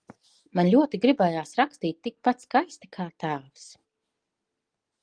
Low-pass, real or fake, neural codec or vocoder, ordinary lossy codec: 9.9 kHz; real; none; Opus, 24 kbps